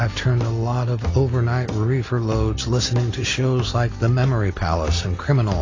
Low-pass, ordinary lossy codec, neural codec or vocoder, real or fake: 7.2 kHz; AAC, 32 kbps; none; real